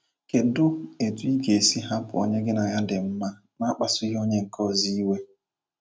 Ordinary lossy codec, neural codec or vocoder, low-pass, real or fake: none; none; none; real